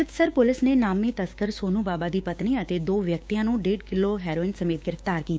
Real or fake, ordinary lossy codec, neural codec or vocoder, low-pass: fake; none; codec, 16 kHz, 6 kbps, DAC; none